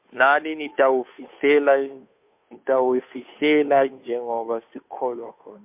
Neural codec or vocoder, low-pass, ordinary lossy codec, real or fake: codec, 16 kHz, 2 kbps, FunCodec, trained on Chinese and English, 25 frames a second; 3.6 kHz; MP3, 32 kbps; fake